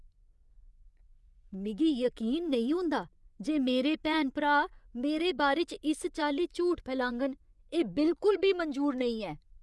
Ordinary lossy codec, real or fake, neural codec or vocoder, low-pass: none; fake; vocoder, 24 kHz, 100 mel bands, Vocos; none